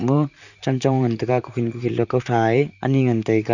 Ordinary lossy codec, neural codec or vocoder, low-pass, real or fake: none; vocoder, 44.1 kHz, 128 mel bands, Pupu-Vocoder; 7.2 kHz; fake